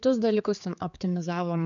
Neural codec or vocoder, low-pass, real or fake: codec, 16 kHz, 4 kbps, X-Codec, HuBERT features, trained on general audio; 7.2 kHz; fake